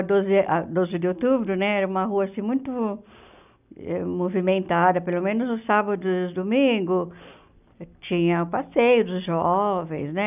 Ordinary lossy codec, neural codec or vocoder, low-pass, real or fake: none; none; 3.6 kHz; real